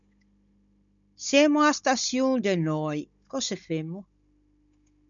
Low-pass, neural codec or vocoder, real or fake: 7.2 kHz; codec, 16 kHz, 16 kbps, FunCodec, trained on Chinese and English, 50 frames a second; fake